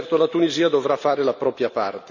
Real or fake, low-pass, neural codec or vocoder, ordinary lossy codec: real; 7.2 kHz; none; none